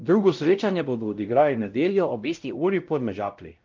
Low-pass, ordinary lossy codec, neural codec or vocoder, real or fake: 7.2 kHz; Opus, 24 kbps; codec, 16 kHz, 0.5 kbps, X-Codec, WavLM features, trained on Multilingual LibriSpeech; fake